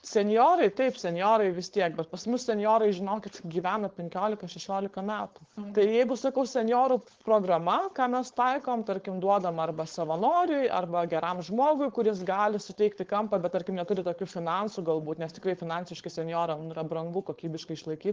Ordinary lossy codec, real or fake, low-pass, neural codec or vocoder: Opus, 16 kbps; fake; 7.2 kHz; codec, 16 kHz, 4.8 kbps, FACodec